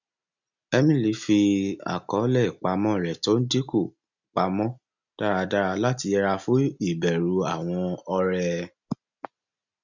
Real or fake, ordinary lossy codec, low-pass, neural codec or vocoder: real; none; 7.2 kHz; none